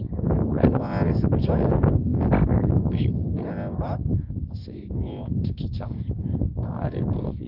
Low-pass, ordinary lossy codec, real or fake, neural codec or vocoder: 5.4 kHz; Opus, 32 kbps; fake; codec, 24 kHz, 0.9 kbps, WavTokenizer, medium music audio release